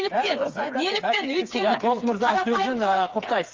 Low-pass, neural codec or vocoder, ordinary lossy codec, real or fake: 7.2 kHz; codec, 16 kHz, 4 kbps, FreqCodec, smaller model; Opus, 32 kbps; fake